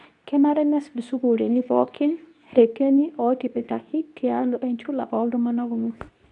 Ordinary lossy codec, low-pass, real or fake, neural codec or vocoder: none; 10.8 kHz; fake; codec, 24 kHz, 0.9 kbps, WavTokenizer, medium speech release version 2